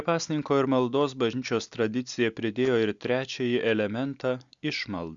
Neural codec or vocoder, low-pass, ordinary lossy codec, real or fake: none; 7.2 kHz; Opus, 64 kbps; real